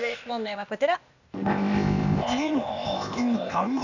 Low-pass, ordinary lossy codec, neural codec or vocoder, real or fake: 7.2 kHz; none; codec, 16 kHz, 0.8 kbps, ZipCodec; fake